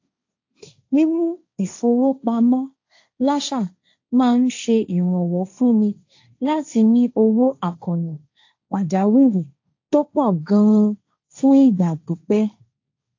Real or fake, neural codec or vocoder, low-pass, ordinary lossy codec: fake; codec, 16 kHz, 1.1 kbps, Voila-Tokenizer; 7.2 kHz; AAC, 48 kbps